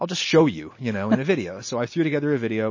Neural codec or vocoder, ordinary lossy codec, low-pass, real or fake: none; MP3, 32 kbps; 7.2 kHz; real